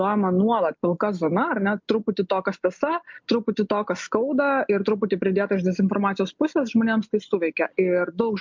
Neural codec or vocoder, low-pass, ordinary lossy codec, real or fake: none; 7.2 kHz; MP3, 64 kbps; real